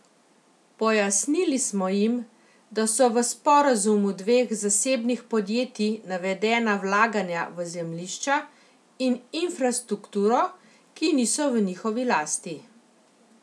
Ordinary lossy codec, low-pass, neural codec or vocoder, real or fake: none; none; none; real